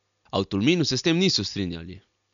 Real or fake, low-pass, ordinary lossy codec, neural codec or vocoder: real; 7.2 kHz; none; none